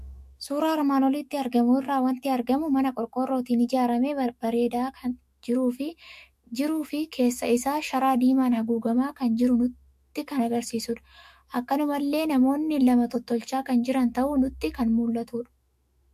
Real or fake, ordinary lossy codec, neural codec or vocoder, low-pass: fake; MP3, 64 kbps; autoencoder, 48 kHz, 128 numbers a frame, DAC-VAE, trained on Japanese speech; 14.4 kHz